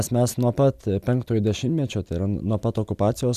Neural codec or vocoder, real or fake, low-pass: none; real; 14.4 kHz